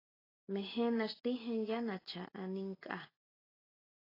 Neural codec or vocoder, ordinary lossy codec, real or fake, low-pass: none; AAC, 24 kbps; real; 5.4 kHz